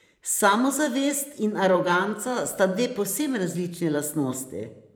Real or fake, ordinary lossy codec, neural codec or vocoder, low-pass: fake; none; vocoder, 44.1 kHz, 128 mel bands every 512 samples, BigVGAN v2; none